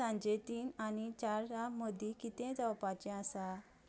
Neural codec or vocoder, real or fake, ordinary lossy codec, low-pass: none; real; none; none